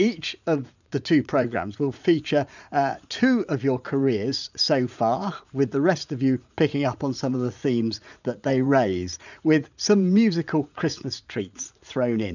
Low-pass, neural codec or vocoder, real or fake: 7.2 kHz; vocoder, 44.1 kHz, 80 mel bands, Vocos; fake